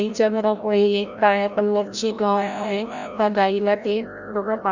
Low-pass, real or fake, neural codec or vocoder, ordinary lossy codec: 7.2 kHz; fake; codec, 16 kHz, 0.5 kbps, FreqCodec, larger model; none